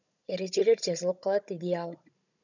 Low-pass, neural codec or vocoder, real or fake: 7.2 kHz; codec, 16 kHz, 16 kbps, FunCodec, trained on Chinese and English, 50 frames a second; fake